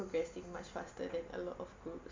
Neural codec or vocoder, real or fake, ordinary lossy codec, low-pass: none; real; MP3, 48 kbps; 7.2 kHz